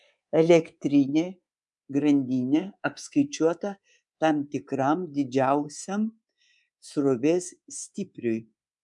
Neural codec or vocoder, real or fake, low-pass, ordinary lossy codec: codec, 24 kHz, 3.1 kbps, DualCodec; fake; 10.8 kHz; MP3, 96 kbps